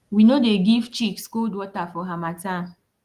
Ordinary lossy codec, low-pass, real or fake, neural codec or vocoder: Opus, 24 kbps; 14.4 kHz; real; none